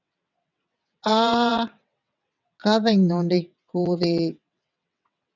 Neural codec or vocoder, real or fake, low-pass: vocoder, 22.05 kHz, 80 mel bands, WaveNeXt; fake; 7.2 kHz